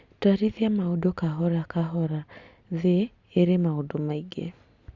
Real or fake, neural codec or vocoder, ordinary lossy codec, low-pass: real; none; Opus, 64 kbps; 7.2 kHz